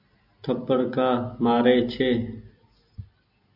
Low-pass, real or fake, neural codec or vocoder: 5.4 kHz; real; none